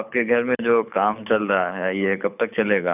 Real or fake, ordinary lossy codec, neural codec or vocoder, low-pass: fake; none; codec, 24 kHz, 6 kbps, HILCodec; 3.6 kHz